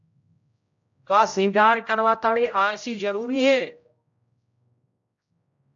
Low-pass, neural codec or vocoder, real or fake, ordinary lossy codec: 7.2 kHz; codec, 16 kHz, 0.5 kbps, X-Codec, HuBERT features, trained on general audio; fake; AAC, 64 kbps